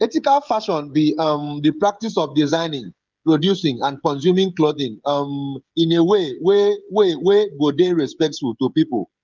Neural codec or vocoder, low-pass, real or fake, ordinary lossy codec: codec, 16 kHz, 16 kbps, FreqCodec, smaller model; 7.2 kHz; fake; Opus, 24 kbps